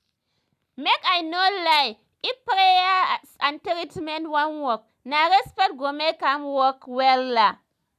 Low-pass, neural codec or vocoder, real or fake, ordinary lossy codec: 14.4 kHz; none; real; none